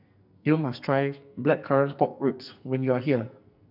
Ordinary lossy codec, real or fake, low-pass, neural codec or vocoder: none; fake; 5.4 kHz; codec, 44.1 kHz, 2.6 kbps, SNAC